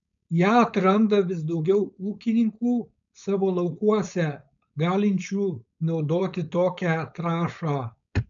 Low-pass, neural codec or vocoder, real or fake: 7.2 kHz; codec, 16 kHz, 4.8 kbps, FACodec; fake